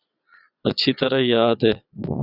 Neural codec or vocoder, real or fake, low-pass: vocoder, 22.05 kHz, 80 mel bands, Vocos; fake; 5.4 kHz